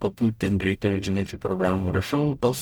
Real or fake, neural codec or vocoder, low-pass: fake; codec, 44.1 kHz, 0.9 kbps, DAC; 19.8 kHz